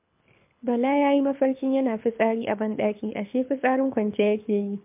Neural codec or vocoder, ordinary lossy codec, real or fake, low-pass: codec, 24 kHz, 6 kbps, HILCodec; MP3, 32 kbps; fake; 3.6 kHz